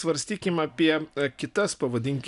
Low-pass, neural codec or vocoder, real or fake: 10.8 kHz; none; real